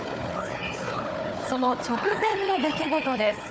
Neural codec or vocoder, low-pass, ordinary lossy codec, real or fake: codec, 16 kHz, 4 kbps, FunCodec, trained on Chinese and English, 50 frames a second; none; none; fake